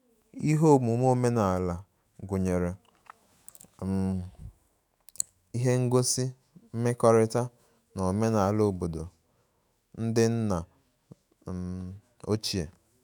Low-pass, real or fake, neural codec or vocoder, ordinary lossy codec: none; fake; autoencoder, 48 kHz, 128 numbers a frame, DAC-VAE, trained on Japanese speech; none